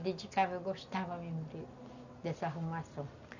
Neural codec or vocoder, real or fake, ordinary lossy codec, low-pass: none; real; none; 7.2 kHz